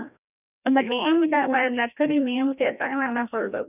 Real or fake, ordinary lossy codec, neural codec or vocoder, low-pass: fake; none; codec, 16 kHz, 1 kbps, FreqCodec, larger model; 3.6 kHz